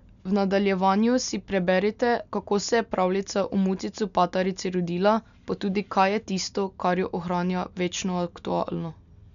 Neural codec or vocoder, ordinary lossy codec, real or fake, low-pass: none; none; real; 7.2 kHz